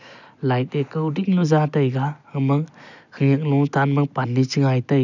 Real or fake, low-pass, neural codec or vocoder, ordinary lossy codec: real; 7.2 kHz; none; none